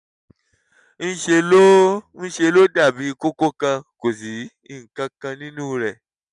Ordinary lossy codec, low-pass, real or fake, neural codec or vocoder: none; 10.8 kHz; real; none